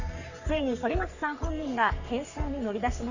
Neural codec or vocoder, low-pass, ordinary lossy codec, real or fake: codec, 44.1 kHz, 3.4 kbps, Pupu-Codec; 7.2 kHz; none; fake